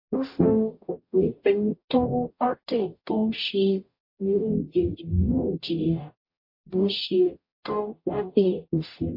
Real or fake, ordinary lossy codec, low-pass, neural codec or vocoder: fake; MP3, 32 kbps; 5.4 kHz; codec, 44.1 kHz, 0.9 kbps, DAC